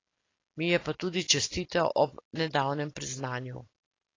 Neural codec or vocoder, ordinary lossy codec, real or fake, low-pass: none; AAC, 32 kbps; real; 7.2 kHz